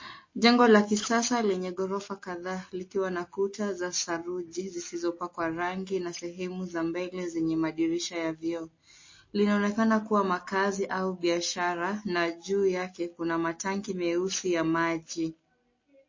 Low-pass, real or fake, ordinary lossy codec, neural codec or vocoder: 7.2 kHz; real; MP3, 32 kbps; none